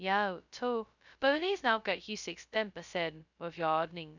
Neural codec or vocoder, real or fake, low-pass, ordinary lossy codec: codec, 16 kHz, 0.2 kbps, FocalCodec; fake; 7.2 kHz; none